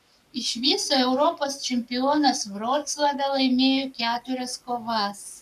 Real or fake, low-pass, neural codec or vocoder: fake; 14.4 kHz; codec, 44.1 kHz, 7.8 kbps, Pupu-Codec